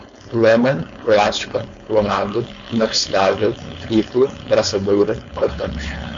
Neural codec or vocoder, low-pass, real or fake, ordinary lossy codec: codec, 16 kHz, 4.8 kbps, FACodec; 7.2 kHz; fake; MP3, 48 kbps